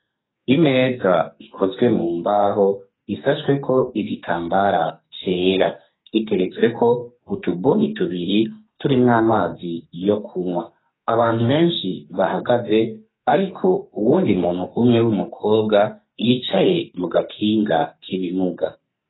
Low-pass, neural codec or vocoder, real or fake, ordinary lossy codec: 7.2 kHz; codec, 44.1 kHz, 2.6 kbps, SNAC; fake; AAC, 16 kbps